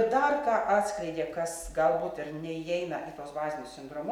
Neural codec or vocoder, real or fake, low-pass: none; real; 19.8 kHz